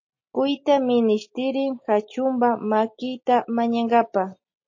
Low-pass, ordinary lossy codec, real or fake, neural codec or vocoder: 7.2 kHz; MP3, 32 kbps; real; none